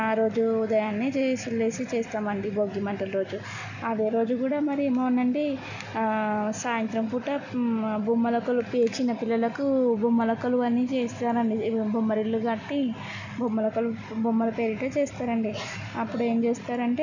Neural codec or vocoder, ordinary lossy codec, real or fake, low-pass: none; none; real; 7.2 kHz